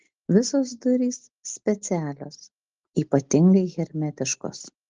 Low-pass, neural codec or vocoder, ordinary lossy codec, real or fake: 7.2 kHz; none; Opus, 16 kbps; real